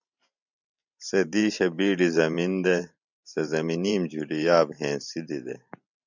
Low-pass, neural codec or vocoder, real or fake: 7.2 kHz; vocoder, 44.1 kHz, 128 mel bands every 512 samples, BigVGAN v2; fake